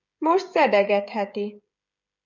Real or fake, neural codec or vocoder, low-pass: fake; codec, 16 kHz, 16 kbps, FreqCodec, smaller model; 7.2 kHz